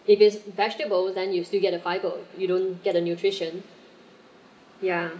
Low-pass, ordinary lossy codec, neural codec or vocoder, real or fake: none; none; none; real